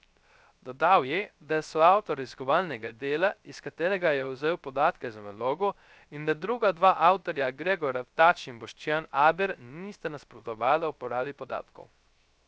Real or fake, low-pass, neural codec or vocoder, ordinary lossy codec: fake; none; codec, 16 kHz, 0.3 kbps, FocalCodec; none